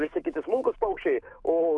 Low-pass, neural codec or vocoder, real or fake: 10.8 kHz; codec, 44.1 kHz, 7.8 kbps, DAC; fake